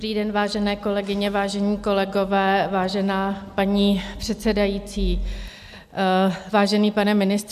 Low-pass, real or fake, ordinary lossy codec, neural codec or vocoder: 14.4 kHz; real; AAC, 96 kbps; none